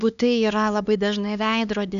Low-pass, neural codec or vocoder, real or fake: 7.2 kHz; codec, 16 kHz, 2 kbps, X-Codec, HuBERT features, trained on LibriSpeech; fake